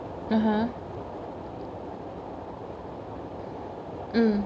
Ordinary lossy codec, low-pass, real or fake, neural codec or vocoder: none; none; real; none